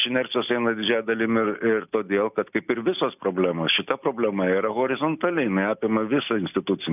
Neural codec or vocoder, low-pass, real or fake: none; 3.6 kHz; real